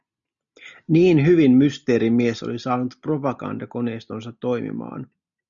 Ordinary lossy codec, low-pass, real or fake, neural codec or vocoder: MP3, 96 kbps; 7.2 kHz; real; none